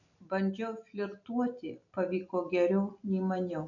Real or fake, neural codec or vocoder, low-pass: real; none; 7.2 kHz